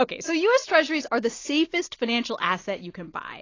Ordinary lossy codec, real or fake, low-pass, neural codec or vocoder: AAC, 32 kbps; real; 7.2 kHz; none